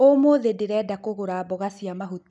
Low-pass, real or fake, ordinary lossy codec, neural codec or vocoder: 10.8 kHz; real; none; none